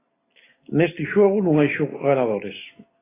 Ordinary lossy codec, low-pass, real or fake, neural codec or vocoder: AAC, 16 kbps; 3.6 kHz; real; none